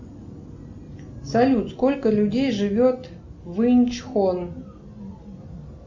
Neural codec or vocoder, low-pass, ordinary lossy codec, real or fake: none; 7.2 kHz; MP3, 64 kbps; real